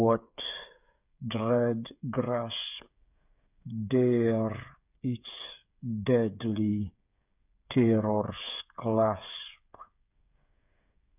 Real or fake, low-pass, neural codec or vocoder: fake; 3.6 kHz; codec, 16 kHz, 8 kbps, FreqCodec, smaller model